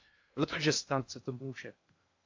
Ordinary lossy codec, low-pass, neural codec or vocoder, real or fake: AAC, 48 kbps; 7.2 kHz; codec, 16 kHz in and 24 kHz out, 0.6 kbps, FocalCodec, streaming, 2048 codes; fake